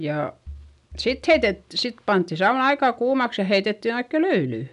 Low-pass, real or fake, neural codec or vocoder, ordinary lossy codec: 10.8 kHz; real; none; none